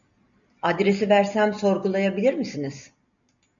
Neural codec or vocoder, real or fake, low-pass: none; real; 7.2 kHz